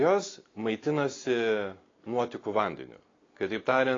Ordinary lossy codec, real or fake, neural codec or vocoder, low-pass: AAC, 32 kbps; real; none; 7.2 kHz